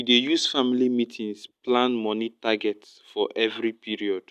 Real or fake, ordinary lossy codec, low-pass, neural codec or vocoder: real; none; 14.4 kHz; none